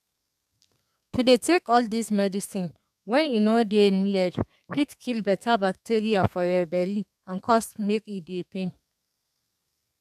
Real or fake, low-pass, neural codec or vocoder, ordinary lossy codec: fake; 14.4 kHz; codec, 32 kHz, 1.9 kbps, SNAC; none